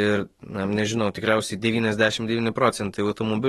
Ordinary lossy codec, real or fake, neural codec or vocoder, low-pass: AAC, 32 kbps; fake; autoencoder, 48 kHz, 128 numbers a frame, DAC-VAE, trained on Japanese speech; 19.8 kHz